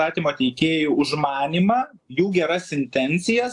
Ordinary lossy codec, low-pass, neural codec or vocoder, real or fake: AAC, 64 kbps; 10.8 kHz; none; real